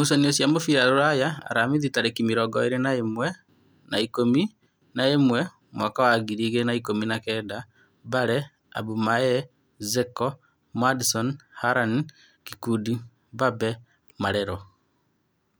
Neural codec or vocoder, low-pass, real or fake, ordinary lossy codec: none; none; real; none